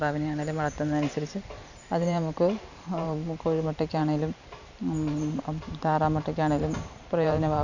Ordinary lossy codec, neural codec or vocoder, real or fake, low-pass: none; vocoder, 44.1 kHz, 128 mel bands every 512 samples, BigVGAN v2; fake; 7.2 kHz